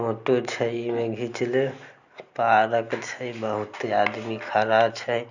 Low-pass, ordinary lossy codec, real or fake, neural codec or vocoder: 7.2 kHz; none; real; none